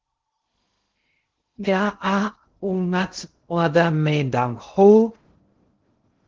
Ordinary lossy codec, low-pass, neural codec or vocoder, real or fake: Opus, 16 kbps; 7.2 kHz; codec, 16 kHz in and 24 kHz out, 0.6 kbps, FocalCodec, streaming, 2048 codes; fake